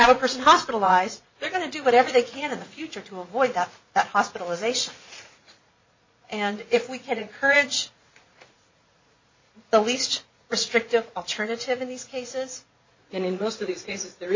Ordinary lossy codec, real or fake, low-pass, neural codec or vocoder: MP3, 32 kbps; fake; 7.2 kHz; vocoder, 44.1 kHz, 80 mel bands, Vocos